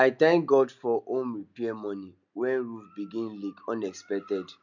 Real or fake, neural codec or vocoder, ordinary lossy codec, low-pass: real; none; none; 7.2 kHz